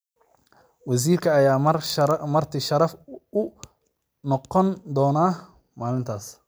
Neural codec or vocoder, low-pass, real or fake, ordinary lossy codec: none; none; real; none